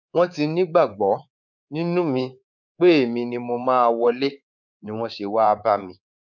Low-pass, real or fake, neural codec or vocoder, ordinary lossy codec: 7.2 kHz; fake; codec, 24 kHz, 3.1 kbps, DualCodec; none